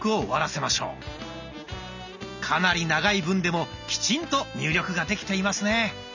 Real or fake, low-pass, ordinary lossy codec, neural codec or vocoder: real; 7.2 kHz; none; none